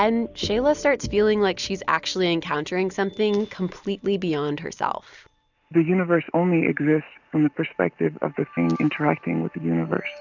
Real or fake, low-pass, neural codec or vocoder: real; 7.2 kHz; none